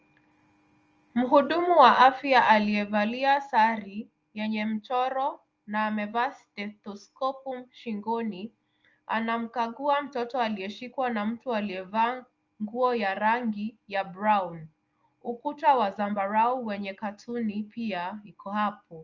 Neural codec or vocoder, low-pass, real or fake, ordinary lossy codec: none; 7.2 kHz; real; Opus, 24 kbps